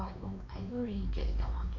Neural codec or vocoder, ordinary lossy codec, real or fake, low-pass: codec, 16 kHz, 2 kbps, X-Codec, WavLM features, trained on Multilingual LibriSpeech; none; fake; 7.2 kHz